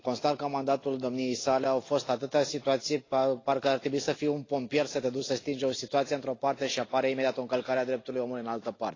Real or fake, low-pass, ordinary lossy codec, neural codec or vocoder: real; 7.2 kHz; AAC, 32 kbps; none